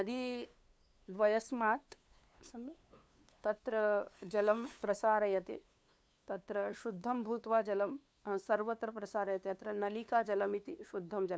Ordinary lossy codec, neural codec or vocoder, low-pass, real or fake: none; codec, 16 kHz, 2 kbps, FunCodec, trained on LibriTTS, 25 frames a second; none; fake